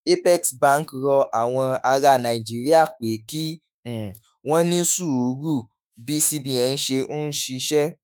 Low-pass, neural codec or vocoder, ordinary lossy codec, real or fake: none; autoencoder, 48 kHz, 32 numbers a frame, DAC-VAE, trained on Japanese speech; none; fake